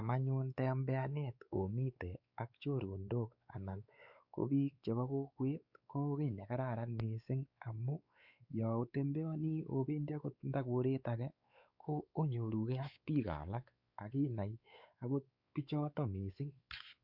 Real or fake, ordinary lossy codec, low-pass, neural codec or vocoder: fake; Opus, 64 kbps; 5.4 kHz; codec, 16 kHz, 4 kbps, X-Codec, WavLM features, trained on Multilingual LibriSpeech